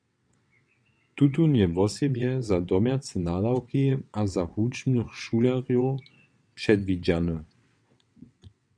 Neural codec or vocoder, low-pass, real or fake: vocoder, 22.05 kHz, 80 mel bands, WaveNeXt; 9.9 kHz; fake